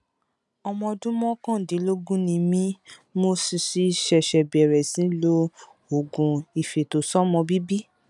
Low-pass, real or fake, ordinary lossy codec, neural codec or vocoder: 10.8 kHz; real; none; none